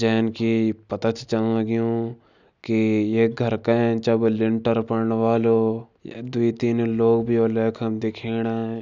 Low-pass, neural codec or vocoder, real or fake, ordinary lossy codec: 7.2 kHz; none; real; none